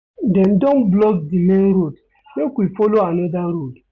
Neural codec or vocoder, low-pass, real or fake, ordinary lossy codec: none; 7.2 kHz; real; none